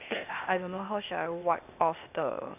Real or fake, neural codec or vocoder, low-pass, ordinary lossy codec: fake; codec, 16 kHz, 0.8 kbps, ZipCodec; 3.6 kHz; none